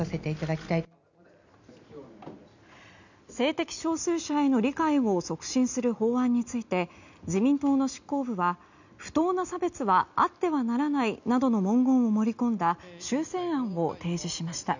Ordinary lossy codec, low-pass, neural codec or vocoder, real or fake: none; 7.2 kHz; none; real